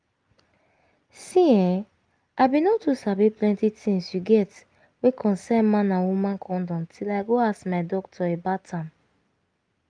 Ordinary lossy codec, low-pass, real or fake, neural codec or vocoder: Opus, 32 kbps; 9.9 kHz; real; none